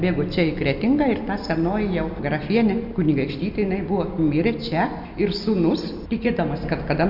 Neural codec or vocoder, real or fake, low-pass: none; real; 5.4 kHz